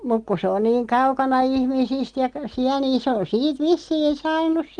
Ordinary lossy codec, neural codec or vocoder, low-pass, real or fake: Opus, 24 kbps; none; 9.9 kHz; real